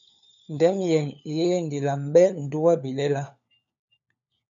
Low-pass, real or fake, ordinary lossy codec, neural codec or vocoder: 7.2 kHz; fake; MP3, 96 kbps; codec, 16 kHz, 4 kbps, FunCodec, trained on LibriTTS, 50 frames a second